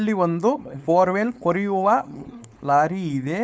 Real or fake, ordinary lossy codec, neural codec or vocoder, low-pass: fake; none; codec, 16 kHz, 4.8 kbps, FACodec; none